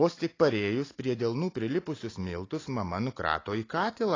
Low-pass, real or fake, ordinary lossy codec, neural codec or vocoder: 7.2 kHz; real; AAC, 32 kbps; none